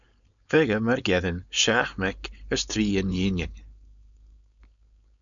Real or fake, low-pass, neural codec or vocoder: fake; 7.2 kHz; codec, 16 kHz, 4.8 kbps, FACodec